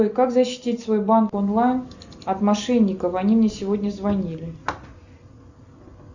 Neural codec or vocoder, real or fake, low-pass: none; real; 7.2 kHz